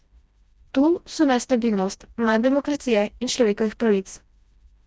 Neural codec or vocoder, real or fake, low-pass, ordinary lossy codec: codec, 16 kHz, 1 kbps, FreqCodec, smaller model; fake; none; none